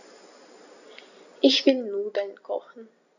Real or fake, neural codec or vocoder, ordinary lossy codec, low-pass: real; none; none; 7.2 kHz